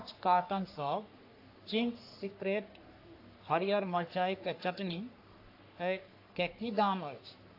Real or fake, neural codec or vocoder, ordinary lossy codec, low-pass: fake; codec, 44.1 kHz, 3.4 kbps, Pupu-Codec; AAC, 32 kbps; 5.4 kHz